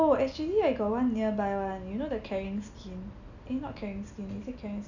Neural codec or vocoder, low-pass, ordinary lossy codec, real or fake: none; 7.2 kHz; none; real